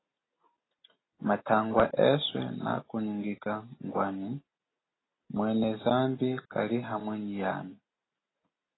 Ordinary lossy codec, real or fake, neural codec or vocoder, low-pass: AAC, 16 kbps; real; none; 7.2 kHz